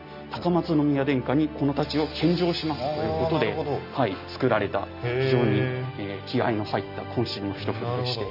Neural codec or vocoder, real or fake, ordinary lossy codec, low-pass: none; real; none; 5.4 kHz